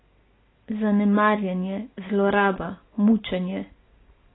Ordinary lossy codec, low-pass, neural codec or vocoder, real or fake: AAC, 16 kbps; 7.2 kHz; none; real